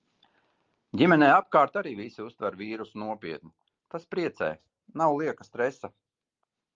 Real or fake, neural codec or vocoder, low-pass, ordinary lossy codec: real; none; 7.2 kHz; Opus, 32 kbps